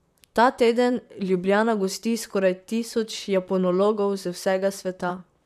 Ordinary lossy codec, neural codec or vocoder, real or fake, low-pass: none; vocoder, 44.1 kHz, 128 mel bands, Pupu-Vocoder; fake; 14.4 kHz